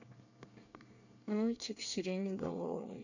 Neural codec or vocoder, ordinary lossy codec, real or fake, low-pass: codec, 24 kHz, 1 kbps, SNAC; none; fake; 7.2 kHz